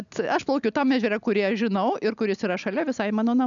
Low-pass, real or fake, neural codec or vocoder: 7.2 kHz; real; none